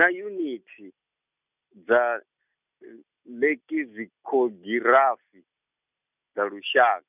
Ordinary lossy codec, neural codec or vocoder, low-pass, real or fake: none; none; 3.6 kHz; real